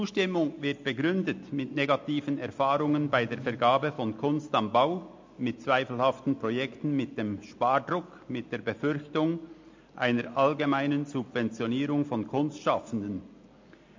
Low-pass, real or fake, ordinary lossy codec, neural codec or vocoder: 7.2 kHz; real; MP3, 48 kbps; none